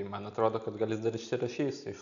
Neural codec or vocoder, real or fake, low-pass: none; real; 7.2 kHz